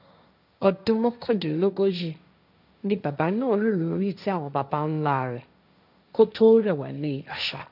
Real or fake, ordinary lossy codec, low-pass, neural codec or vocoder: fake; none; 5.4 kHz; codec, 16 kHz, 1.1 kbps, Voila-Tokenizer